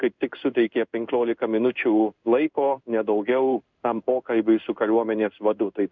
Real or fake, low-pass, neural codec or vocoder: fake; 7.2 kHz; codec, 16 kHz in and 24 kHz out, 1 kbps, XY-Tokenizer